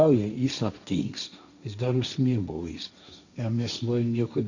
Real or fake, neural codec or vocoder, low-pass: fake; codec, 16 kHz, 1.1 kbps, Voila-Tokenizer; 7.2 kHz